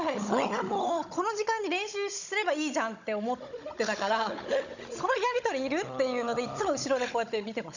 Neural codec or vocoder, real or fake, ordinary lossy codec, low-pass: codec, 16 kHz, 16 kbps, FunCodec, trained on Chinese and English, 50 frames a second; fake; none; 7.2 kHz